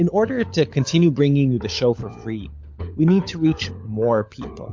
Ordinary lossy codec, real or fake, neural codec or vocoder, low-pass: MP3, 48 kbps; fake; codec, 16 kHz, 16 kbps, FunCodec, trained on LibriTTS, 50 frames a second; 7.2 kHz